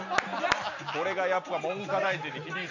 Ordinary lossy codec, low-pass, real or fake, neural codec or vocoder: none; 7.2 kHz; real; none